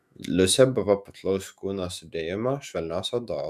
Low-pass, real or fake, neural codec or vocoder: 14.4 kHz; fake; autoencoder, 48 kHz, 128 numbers a frame, DAC-VAE, trained on Japanese speech